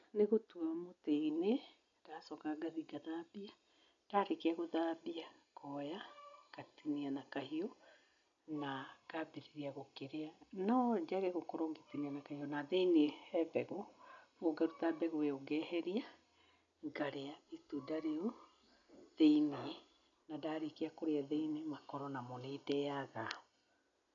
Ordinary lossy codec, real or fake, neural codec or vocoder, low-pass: none; real; none; 7.2 kHz